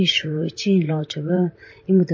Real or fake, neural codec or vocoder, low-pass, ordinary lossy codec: fake; vocoder, 44.1 kHz, 128 mel bands, Pupu-Vocoder; 7.2 kHz; MP3, 32 kbps